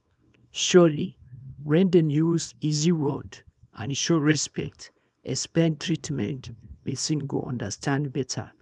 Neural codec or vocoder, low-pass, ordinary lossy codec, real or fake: codec, 24 kHz, 0.9 kbps, WavTokenizer, small release; 10.8 kHz; none; fake